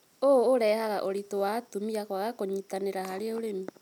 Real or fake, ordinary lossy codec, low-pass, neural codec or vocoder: real; none; 19.8 kHz; none